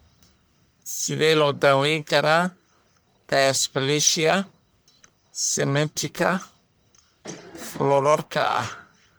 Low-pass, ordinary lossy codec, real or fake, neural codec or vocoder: none; none; fake; codec, 44.1 kHz, 1.7 kbps, Pupu-Codec